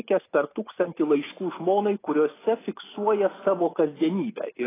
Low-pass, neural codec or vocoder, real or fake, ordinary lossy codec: 3.6 kHz; none; real; AAC, 16 kbps